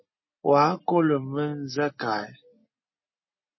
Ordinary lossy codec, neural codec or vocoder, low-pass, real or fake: MP3, 24 kbps; none; 7.2 kHz; real